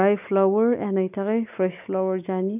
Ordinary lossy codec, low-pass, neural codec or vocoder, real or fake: none; 3.6 kHz; none; real